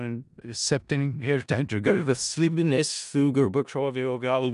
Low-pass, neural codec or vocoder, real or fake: 10.8 kHz; codec, 16 kHz in and 24 kHz out, 0.4 kbps, LongCat-Audio-Codec, four codebook decoder; fake